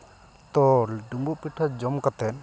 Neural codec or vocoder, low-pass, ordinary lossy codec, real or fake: none; none; none; real